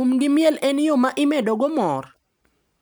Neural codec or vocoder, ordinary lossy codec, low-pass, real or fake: none; none; none; real